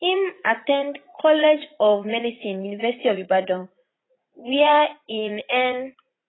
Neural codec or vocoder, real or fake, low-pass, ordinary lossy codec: vocoder, 44.1 kHz, 80 mel bands, Vocos; fake; 7.2 kHz; AAC, 16 kbps